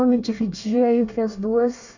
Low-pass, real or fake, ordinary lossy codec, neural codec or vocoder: 7.2 kHz; fake; none; codec, 24 kHz, 1 kbps, SNAC